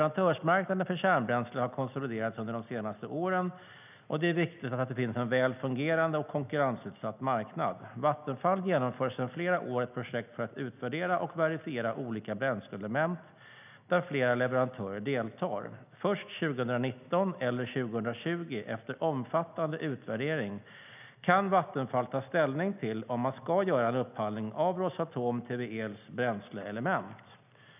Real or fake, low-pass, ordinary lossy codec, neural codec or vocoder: real; 3.6 kHz; none; none